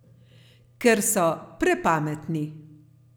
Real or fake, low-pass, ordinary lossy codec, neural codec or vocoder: real; none; none; none